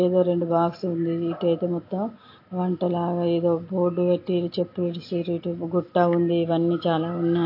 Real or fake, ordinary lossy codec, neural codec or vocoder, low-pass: real; AAC, 32 kbps; none; 5.4 kHz